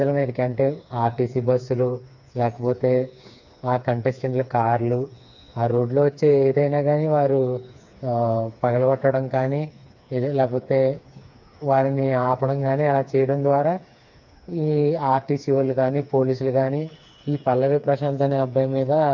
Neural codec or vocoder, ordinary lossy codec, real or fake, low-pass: codec, 16 kHz, 4 kbps, FreqCodec, smaller model; MP3, 64 kbps; fake; 7.2 kHz